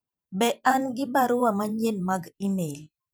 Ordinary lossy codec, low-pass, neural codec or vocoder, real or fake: none; none; vocoder, 44.1 kHz, 128 mel bands every 512 samples, BigVGAN v2; fake